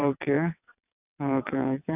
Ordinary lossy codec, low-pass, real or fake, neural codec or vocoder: none; 3.6 kHz; fake; vocoder, 22.05 kHz, 80 mel bands, WaveNeXt